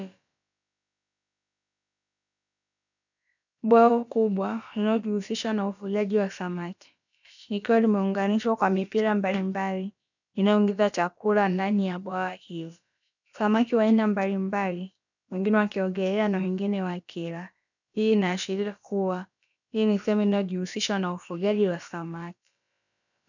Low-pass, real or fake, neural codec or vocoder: 7.2 kHz; fake; codec, 16 kHz, about 1 kbps, DyCAST, with the encoder's durations